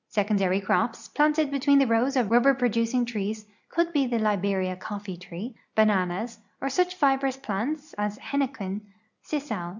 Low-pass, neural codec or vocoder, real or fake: 7.2 kHz; none; real